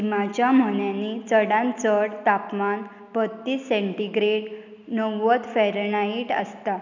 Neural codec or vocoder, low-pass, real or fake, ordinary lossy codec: none; 7.2 kHz; real; none